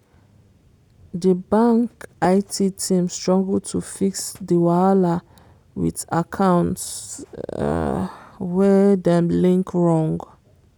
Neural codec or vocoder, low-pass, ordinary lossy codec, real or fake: none; 19.8 kHz; none; real